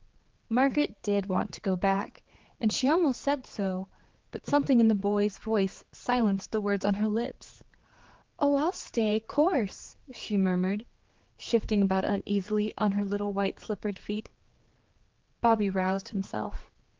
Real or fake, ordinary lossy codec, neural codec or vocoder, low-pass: fake; Opus, 16 kbps; codec, 16 kHz, 4 kbps, X-Codec, HuBERT features, trained on general audio; 7.2 kHz